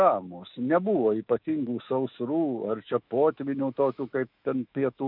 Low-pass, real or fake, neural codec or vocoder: 5.4 kHz; fake; autoencoder, 48 kHz, 128 numbers a frame, DAC-VAE, trained on Japanese speech